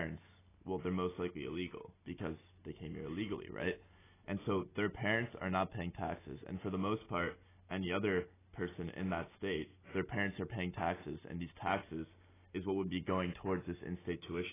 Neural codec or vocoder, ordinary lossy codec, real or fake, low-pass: none; AAC, 16 kbps; real; 3.6 kHz